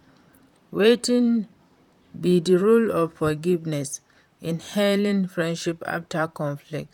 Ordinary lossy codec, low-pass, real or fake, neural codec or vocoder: none; 19.8 kHz; fake; vocoder, 44.1 kHz, 128 mel bands, Pupu-Vocoder